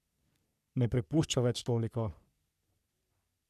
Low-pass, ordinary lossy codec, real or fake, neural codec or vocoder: 14.4 kHz; none; fake; codec, 44.1 kHz, 3.4 kbps, Pupu-Codec